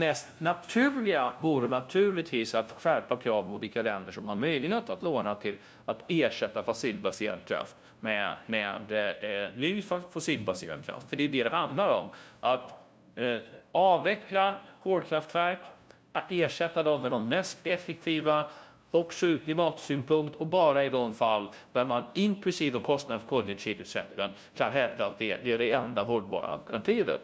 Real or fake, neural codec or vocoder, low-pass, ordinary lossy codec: fake; codec, 16 kHz, 0.5 kbps, FunCodec, trained on LibriTTS, 25 frames a second; none; none